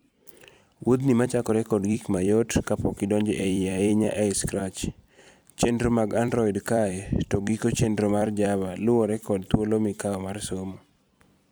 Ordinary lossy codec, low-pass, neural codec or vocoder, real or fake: none; none; vocoder, 44.1 kHz, 128 mel bands every 256 samples, BigVGAN v2; fake